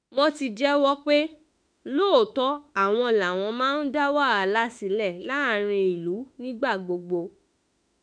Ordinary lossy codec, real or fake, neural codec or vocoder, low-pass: none; fake; autoencoder, 48 kHz, 32 numbers a frame, DAC-VAE, trained on Japanese speech; 9.9 kHz